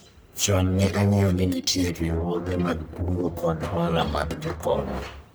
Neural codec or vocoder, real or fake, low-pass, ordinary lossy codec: codec, 44.1 kHz, 1.7 kbps, Pupu-Codec; fake; none; none